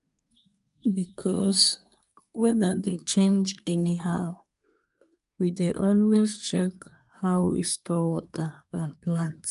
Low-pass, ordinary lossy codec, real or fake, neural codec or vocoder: 10.8 kHz; none; fake; codec, 24 kHz, 1 kbps, SNAC